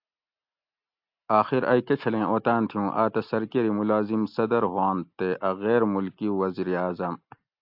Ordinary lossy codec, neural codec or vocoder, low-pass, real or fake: AAC, 48 kbps; none; 5.4 kHz; real